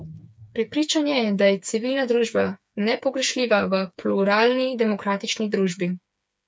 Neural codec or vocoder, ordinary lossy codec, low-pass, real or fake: codec, 16 kHz, 4 kbps, FreqCodec, smaller model; none; none; fake